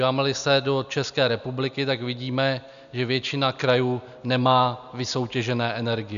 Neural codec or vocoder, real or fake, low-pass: none; real; 7.2 kHz